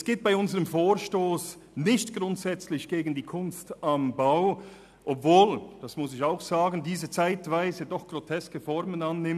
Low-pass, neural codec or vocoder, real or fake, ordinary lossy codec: 14.4 kHz; none; real; none